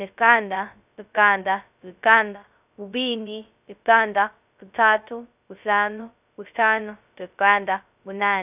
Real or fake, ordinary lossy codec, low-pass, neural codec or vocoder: fake; none; 3.6 kHz; codec, 16 kHz, 0.2 kbps, FocalCodec